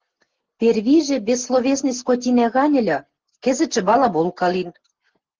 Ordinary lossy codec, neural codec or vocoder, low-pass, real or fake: Opus, 16 kbps; none; 7.2 kHz; real